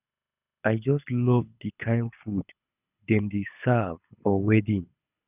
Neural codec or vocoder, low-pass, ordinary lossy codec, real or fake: codec, 24 kHz, 6 kbps, HILCodec; 3.6 kHz; none; fake